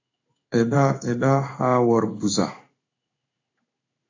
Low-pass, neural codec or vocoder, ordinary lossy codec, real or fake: 7.2 kHz; codec, 16 kHz in and 24 kHz out, 1 kbps, XY-Tokenizer; AAC, 48 kbps; fake